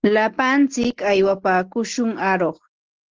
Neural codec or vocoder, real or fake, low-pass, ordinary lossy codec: none; real; 7.2 kHz; Opus, 16 kbps